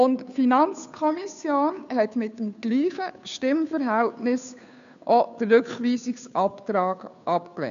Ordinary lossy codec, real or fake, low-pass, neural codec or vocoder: AAC, 96 kbps; fake; 7.2 kHz; codec, 16 kHz, 2 kbps, FunCodec, trained on Chinese and English, 25 frames a second